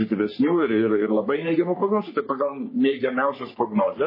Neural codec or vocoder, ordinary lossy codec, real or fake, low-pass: codec, 44.1 kHz, 3.4 kbps, Pupu-Codec; MP3, 24 kbps; fake; 5.4 kHz